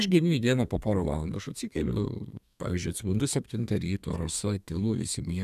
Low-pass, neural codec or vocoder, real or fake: 14.4 kHz; codec, 32 kHz, 1.9 kbps, SNAC; fake